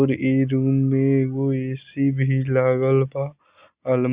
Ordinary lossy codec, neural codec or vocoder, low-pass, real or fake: Opus, 64 kbps; none; 3.6 kHz; real